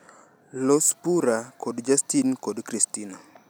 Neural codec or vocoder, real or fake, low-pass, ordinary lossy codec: none; real; none; none